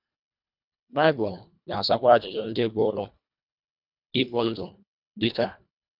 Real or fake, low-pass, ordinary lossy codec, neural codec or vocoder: fake; 5.4 kHz; none; codec, 24 kHz, 1.5 kbps, HILCodec